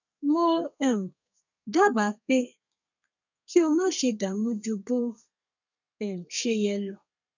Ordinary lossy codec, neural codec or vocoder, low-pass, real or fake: none; codec, 32 kHz, 1.9 kbps, SNAC; 7.2 kHz; fake